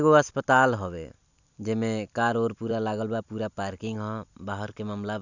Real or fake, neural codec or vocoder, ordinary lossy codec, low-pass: fake; vocoder, 44.1 kHz, 128 mel bands every 256 samples, BigVGAN v2; none; 7.2 kHz